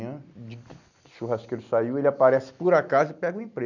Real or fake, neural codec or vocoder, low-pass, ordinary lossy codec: real; none; 7.2 kHz; none